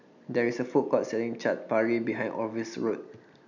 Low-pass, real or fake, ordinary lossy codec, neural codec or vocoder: 7.2 kHz; real; none; none